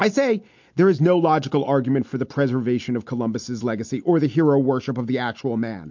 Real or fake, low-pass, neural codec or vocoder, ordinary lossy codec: real; 7.2 kHz; none; MP3, 48 kbps